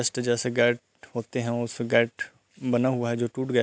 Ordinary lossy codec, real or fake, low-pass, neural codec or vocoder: none; real; none; none